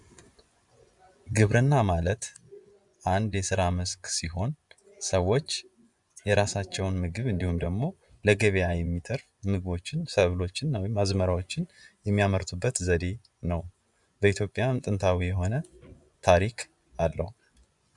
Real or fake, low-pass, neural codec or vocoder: fake; 10.8 kHz; vocoder, 24 kHz, 100 mel bands, Vocos